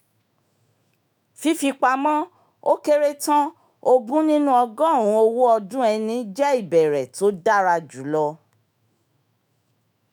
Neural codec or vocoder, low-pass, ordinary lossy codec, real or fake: autoencoder, 48 kHz, 128 numbers a frame, DAC-VAE, trained on Japanese speech; none; none; fake